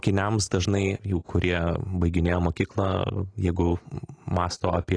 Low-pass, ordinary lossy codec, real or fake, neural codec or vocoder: 9.9 kHz; AAC, 32 kbps; real; none